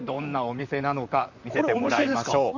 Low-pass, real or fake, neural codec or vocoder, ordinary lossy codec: 7.2 kHz; fake; vocoder, 44.1 kHz, 128 mel bands, Pupu-Vocoder; none